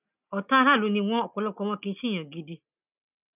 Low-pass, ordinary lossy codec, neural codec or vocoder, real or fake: 3.6 kHz; none; none; real